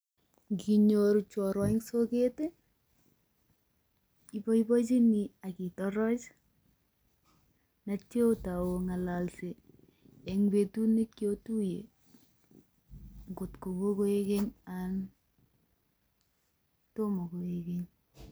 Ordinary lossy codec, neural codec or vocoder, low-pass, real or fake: none; none; none; real